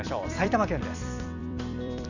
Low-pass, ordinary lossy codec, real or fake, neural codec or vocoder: 7.2 kHz; none; fake; vocoder, 44.1 kHz, 128 mel bands every 256 samples, BigVGAN v2